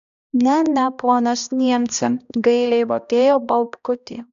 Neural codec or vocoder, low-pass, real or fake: codec, 16 kHz, 1 kbps, X-Codec, HuBERT features, trained on balanced general audio; 7.2 kHz; fake